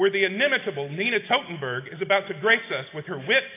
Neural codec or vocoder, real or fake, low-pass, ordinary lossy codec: none; real; 3.6 kHz; AAC, 24 kbps